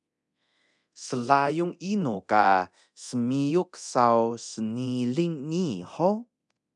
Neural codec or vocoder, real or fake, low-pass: codec, 24 kHz, 0.9 kbps, DualCodec; fake; 10.8 kHz